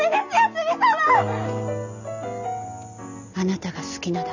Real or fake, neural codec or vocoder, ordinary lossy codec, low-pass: real; none; none; 7.2 kHz